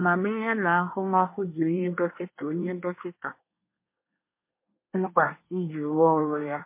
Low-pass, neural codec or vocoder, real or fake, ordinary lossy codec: 3.6 kHz; codec, 24 kHz, 1 kbps, SNAC; fake; MP3, 24 kbps